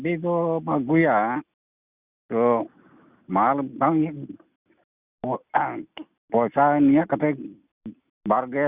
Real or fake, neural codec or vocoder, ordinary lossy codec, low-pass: real; none; Opus, 64 kbps; 3.6 kHz